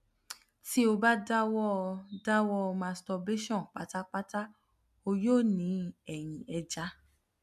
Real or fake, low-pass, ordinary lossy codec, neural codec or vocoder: real; 14.4 kHz; MP3, 96 kbps; none